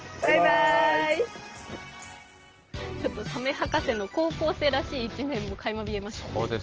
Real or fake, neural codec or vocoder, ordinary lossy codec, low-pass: real; none; Opus, 16 kbps; 7.2 kHz